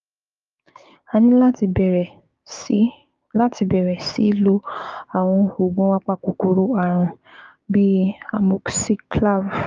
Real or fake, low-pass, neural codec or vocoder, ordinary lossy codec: fake; 7.2 kHz; codec, 16 kHz, 6 kbps, DAC; Opus, 32 kbps